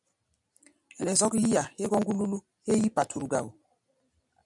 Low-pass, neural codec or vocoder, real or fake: 10.8 kHz; none; real